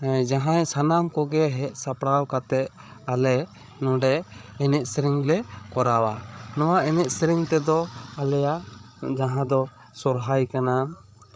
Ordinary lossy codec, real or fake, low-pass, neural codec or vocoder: none; fake; none; codec, 16 kHz, 8 kbps, FreqCodec, larger model